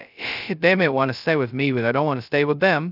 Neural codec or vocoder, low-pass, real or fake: codec, 16 kHz, 0.2 kbps, FocalCodec; 5.4 kHz; fake